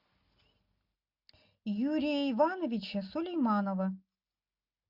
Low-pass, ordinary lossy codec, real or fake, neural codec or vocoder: 5.4 kHz; MP3, 48 kbps; real; none